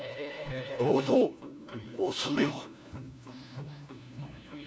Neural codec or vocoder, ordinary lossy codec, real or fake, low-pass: codec, 16 kHz, 1 kbps, FunCodec, trained on LibriTTS, 50 frames a second; none; fake; none